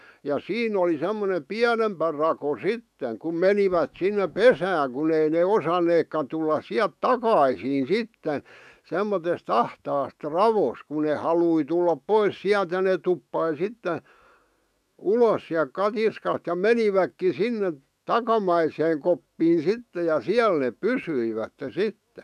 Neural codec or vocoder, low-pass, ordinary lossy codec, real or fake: autoencoder, 48 kHz, 128 numbers a frame, DAC-VAE, trained on Japanese speech; 14.4 kHz; none; fake